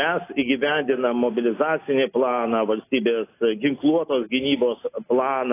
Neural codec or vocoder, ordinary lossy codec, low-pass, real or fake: none; AAC, 24 kbps; 3.6 kHz; real